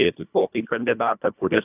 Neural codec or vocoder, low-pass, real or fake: codec, 24 kHz, 1.5 kbps, HILCodec; 3.6 kHz; fake